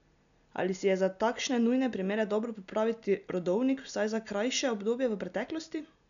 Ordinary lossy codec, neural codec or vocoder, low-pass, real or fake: none; none; 7.2 kHz; real